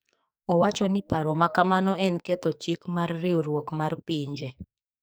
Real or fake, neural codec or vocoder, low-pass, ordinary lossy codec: fake; codec, 44.1 kHz, 2.6 kbps, SNAC; none; none